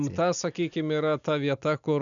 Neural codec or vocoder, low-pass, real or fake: none; 7.2 kHz; real